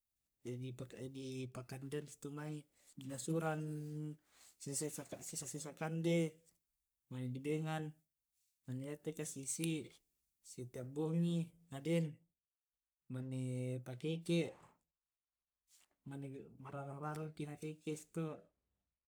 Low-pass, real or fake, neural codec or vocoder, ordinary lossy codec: none; fake; codec, 44.1 kHz, 3.4 kbps, Pupu-Codec; none